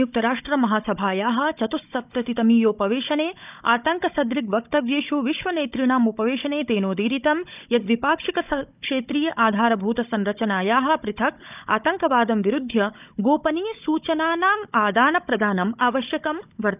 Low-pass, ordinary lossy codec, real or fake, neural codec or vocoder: 3.6 kHz; none; fake; codec, 16 kHz, 16 kbps, FunCodec, trained on Chinese and English, 50 frames a second